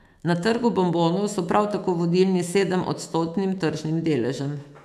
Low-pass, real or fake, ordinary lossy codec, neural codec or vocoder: 14.4 kHz; fake; none; autoencoder, 48 kHz, 128 numbers a frame, DAC-VAE, trained on Japanese speech